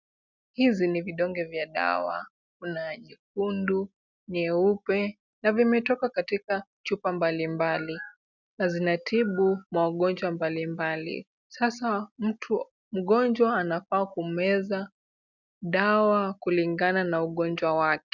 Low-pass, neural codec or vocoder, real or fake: 7.2 kHz; none; real